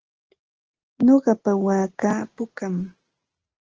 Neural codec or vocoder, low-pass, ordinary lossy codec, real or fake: none; 7.2 kHz; Opus, 16 kbps; real